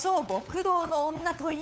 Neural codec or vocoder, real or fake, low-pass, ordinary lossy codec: codec, 16 kHz, 8 kbps, FunCodec, trained on LibriTTS, 25 frames a second; fake; none; none